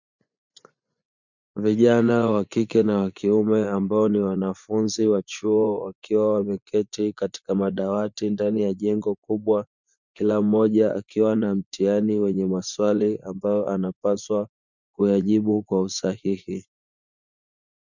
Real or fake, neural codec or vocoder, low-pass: fake; vocoder, 24 kHz, 100 mel bands, Vocos; 7.2 kHz